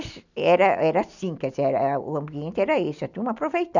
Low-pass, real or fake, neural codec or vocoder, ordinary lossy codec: 7.2 kHz; real; none; none